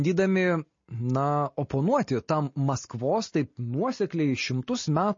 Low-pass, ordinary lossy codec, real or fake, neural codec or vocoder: 7.2 kHz; MP3, 32 kbps; real; none